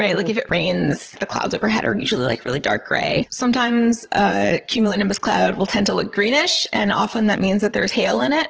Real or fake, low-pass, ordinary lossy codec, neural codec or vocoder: fake; 7.2 kHz; Opus, 16 kbps; vocoder, 22.05 kHz, 80 mel bands, Vocos